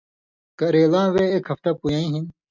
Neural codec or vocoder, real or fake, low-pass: none; real; 7.2 kHz